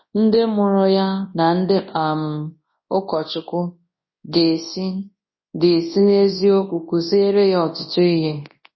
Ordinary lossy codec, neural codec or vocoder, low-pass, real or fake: MP3, 24 kbps; codec, 24 kHz, 0.9 kbps, WavTokenizer, large speech release; 7.2 kHz; fake